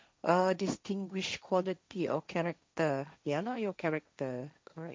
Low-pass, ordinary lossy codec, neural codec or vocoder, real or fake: none; none; codec, 16 kHz, 1.1 kbps, Voila-Tokenizer; fake